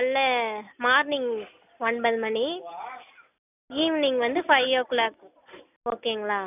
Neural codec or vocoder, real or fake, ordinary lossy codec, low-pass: none; real; none; 3.6 kHz